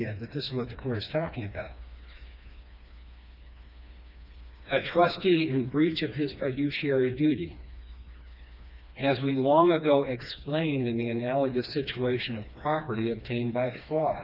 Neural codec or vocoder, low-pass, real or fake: codec, 16 kHz, 2 kbps, FreqCodec, smaller model; 5.4 kHz; fake